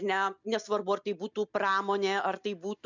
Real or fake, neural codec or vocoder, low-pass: real; none; 7.2 kHz